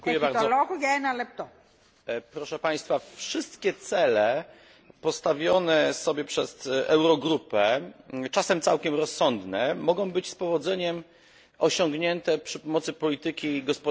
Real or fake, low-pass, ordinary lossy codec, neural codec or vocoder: real; none; none; none